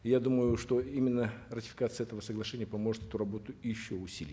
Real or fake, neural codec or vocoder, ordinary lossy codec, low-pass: real; none; none; none